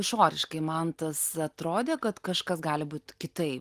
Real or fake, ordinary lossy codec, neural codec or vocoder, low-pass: real; Opus, 24 kbps; none; 14.4 kHz